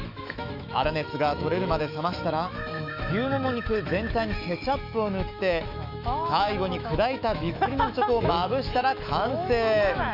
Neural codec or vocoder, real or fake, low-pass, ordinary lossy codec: autoencoder, 48 kHz, 128 numbers a frame, DAC-VAE, trained on Japanese speech; fake; 5.4 kHz; none